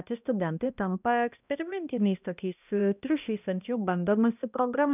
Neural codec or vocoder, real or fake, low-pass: codec, 16 kHz, 1 kbps, X-Codec, HuBERT features, trained on balanced general audio; fake; 3.6 kHz